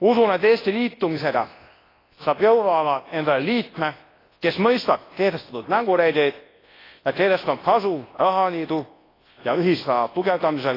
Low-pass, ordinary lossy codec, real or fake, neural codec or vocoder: 5.4 kHz; AAC, 24 kbps; fake; codec, 24 kHz, 0.9 kbps, WavTokenizer, large speech release